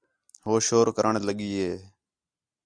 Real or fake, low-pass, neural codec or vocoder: real; 9.9 kHz; none